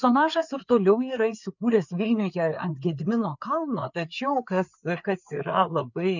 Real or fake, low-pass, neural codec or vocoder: fake; 7.2 kHz; codec, 16 kHz, 4 kbps, FreqCodec, larger model